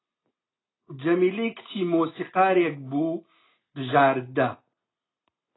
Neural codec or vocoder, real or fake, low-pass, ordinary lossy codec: none; real; 7.2 kHz; AAC, 16 kbps